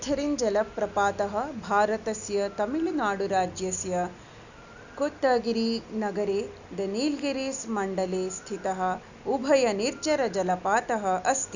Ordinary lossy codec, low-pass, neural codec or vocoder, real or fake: none; 7.2 kHz; none; real